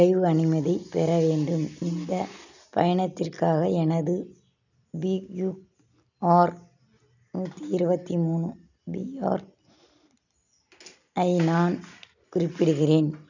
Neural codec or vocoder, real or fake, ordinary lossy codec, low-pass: none; real; none; 7.2 kHz